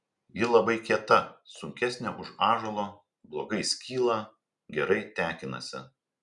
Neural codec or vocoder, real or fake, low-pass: none; real; 10.8 kHz